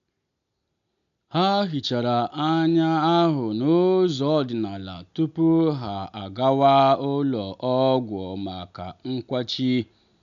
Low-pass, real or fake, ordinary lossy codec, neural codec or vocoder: 7.2 kHz; real; none; none